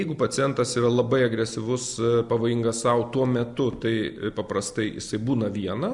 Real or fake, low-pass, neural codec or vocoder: real; 10.8 kHz; none